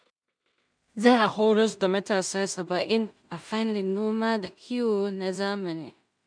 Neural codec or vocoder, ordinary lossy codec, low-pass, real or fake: codec, 16 kHz in and 24 kHz out, 0.4 kbps, LongCat-Audio-Codec, two codebook decoder; none; 9.9 kHz; fake